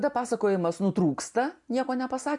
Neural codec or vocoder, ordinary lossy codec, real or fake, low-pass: none; MP3, 64 kbps; real; 10.8 kHz